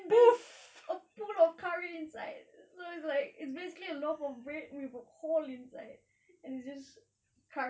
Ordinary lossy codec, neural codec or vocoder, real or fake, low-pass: none; none; real; none